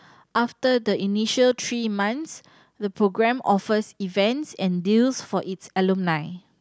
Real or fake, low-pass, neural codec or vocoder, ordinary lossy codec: real; none; none; none